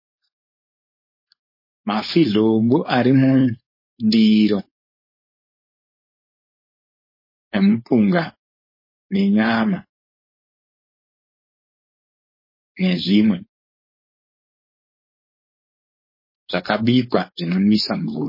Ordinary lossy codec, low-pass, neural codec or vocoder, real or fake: MP3, 24 kbps; 5.4 kHz; codec, 16 kHz, 4.8 kbps, FACodec; fake